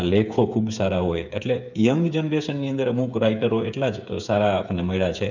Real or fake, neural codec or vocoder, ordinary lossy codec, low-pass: fake; codec, 16 kHz, 8 kbps, FreqCodec, smaller model; none; 7.2 kHz